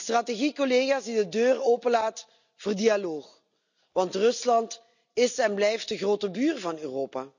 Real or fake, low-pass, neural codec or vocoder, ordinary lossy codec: real; 7.2 kHz; none; none